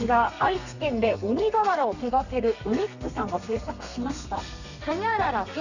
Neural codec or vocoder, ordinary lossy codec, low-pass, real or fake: codec, 32 kHz, 1.9 kbps, SNAC; none; 7.2 kHz; fake